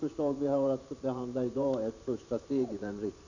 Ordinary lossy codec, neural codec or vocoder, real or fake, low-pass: none; vocoder, 44.1 kHz, 128 mel bands every 512 samples, BigVGAN v2; fake; 7.2 kHz